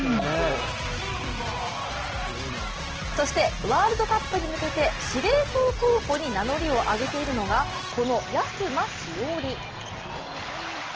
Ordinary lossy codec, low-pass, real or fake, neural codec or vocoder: Opus, 16 kbps; 7.2 kHz; real; none